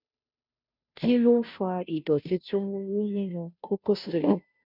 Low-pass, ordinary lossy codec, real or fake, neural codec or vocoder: 5.4 kHz; none; fake; codec, 16 kHz, 0.5 kbps, FunCodec, trained on Chinese and English, 25 frames a second